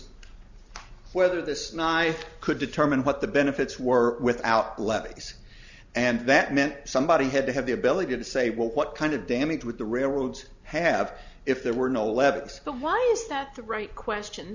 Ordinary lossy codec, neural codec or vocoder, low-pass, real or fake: Opus, 64 kbps; none; 7.2 kHz; real